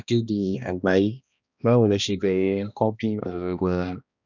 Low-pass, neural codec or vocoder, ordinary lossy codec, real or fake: 7.2 kHz; codec, 16 kHz, 1 kbps, X-Codec, HuBERT features, trained on balanced general audio; none; fake